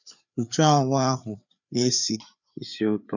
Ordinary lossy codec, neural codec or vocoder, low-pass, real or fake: none; codec, 16 kHz, 4 kbps, FreqCodec, larger model; 7.2 kHz; fake